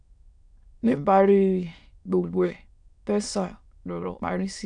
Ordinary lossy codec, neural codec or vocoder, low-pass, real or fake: none; autoencoder, 22.05 kHz, a latent of 192 numbers a frame, VITS, trained on many speakers; 9.9 kHz; fake